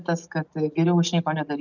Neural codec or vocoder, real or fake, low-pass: none; real; 7.2 kHz